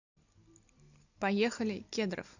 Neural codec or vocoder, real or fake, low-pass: none; real; 7.2 kHz